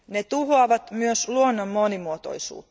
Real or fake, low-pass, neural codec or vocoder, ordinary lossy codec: real; none; none; none